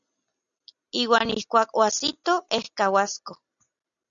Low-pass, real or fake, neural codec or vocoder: 7.2 kHz; real; none